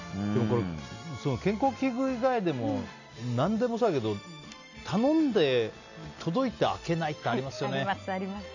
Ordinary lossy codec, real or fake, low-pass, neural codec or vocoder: none; real; 7.2 kHz; none